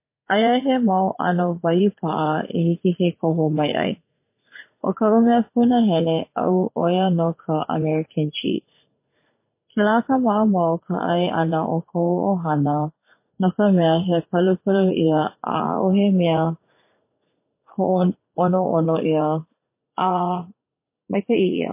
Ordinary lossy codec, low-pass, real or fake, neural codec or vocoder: MP3, 24 kbps; 3.6 kHz; fake; vocoder, 44.1 kHz, 80 mel bands, Vocos